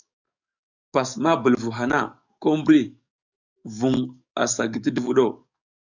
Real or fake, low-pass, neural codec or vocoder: fake; 7.2 kHz; codec, 44.1 kHz, 7.8 kbps, DAC